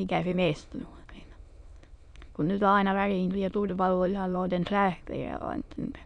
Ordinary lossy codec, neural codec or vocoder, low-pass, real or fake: none; autoencoder, 22.05 kHz, a latent of 192 numbers a frame, VITS, trained on many speakers; 9.9 kHz; fake